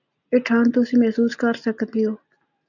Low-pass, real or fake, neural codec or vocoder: 7.2 kHz; real; none